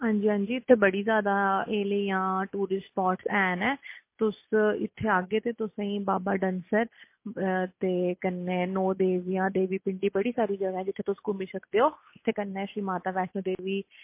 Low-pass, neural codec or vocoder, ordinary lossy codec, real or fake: 3.6 kHz; none; MP3, 24 kbps; real